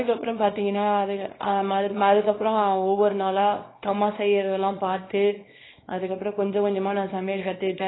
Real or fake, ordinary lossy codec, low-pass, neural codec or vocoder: fake; AAC, 16 kbps; 7.2 kHz; codec, 24 kHz, 0.9 kbps, WavTokenizer, small release